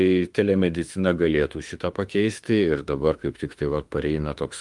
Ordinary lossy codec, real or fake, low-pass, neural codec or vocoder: Opus, 32 kbps; fake; 10.8 kHz; autoencoder, 48 kHz, 32 numbers a frame, DAC-VAE, trained on Japanese speech